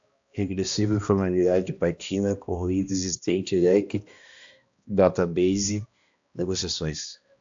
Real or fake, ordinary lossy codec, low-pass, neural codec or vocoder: fake; AAC, 64 kbps; 7.2 kHz; codec, 16 kHz, 1 kbps, X-Codec, HuBERT features, trained on balanced general audio